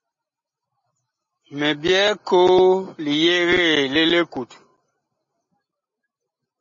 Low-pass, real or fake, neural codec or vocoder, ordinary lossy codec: 7.2 kHz; real; none; MP3, 32 kbps